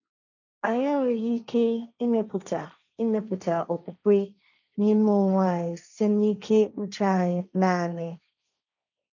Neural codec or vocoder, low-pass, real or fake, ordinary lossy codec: codec, 16 kHz, 1.1 kbps, Voila-Tokenizer; 7.2 kHz; fake; none